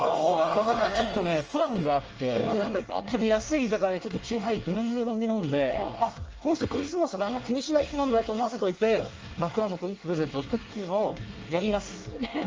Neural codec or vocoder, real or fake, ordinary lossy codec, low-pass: codec, 24 kHz, 1 kbps, SNAC; fake; Opus, 24 kbps; 7.2 kHz